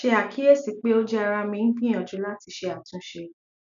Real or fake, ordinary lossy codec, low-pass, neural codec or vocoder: real; none; 7.2 kHz; none